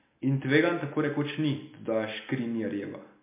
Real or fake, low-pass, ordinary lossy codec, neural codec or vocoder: real; 3.6 kHz; MP3, 24 kbps; none